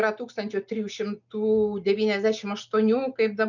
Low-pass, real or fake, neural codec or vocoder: 7.2 kHz; real; none